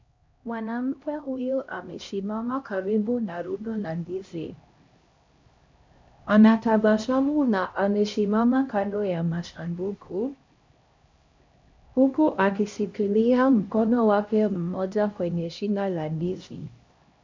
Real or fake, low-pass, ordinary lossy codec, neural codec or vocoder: fake; 7.2 kHz; MP3, 48 kbps; codec, 16 kHz, 1 kbps, X-Codec, HuBERT features, trained on LibriSpeech